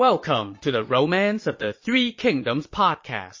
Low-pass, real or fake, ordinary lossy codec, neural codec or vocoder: 7.2 kHz; fake; MP3, 32 kbps; vocoder, 22.05 kHz, 80 mel bands, Vocos